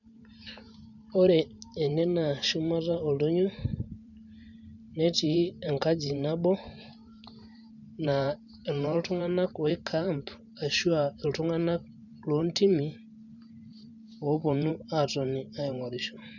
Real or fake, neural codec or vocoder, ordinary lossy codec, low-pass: fake; vocoder, 44.1 kHz, 128 mel bands every 512 samples, BigVGAN v2; none; 7.2 kHz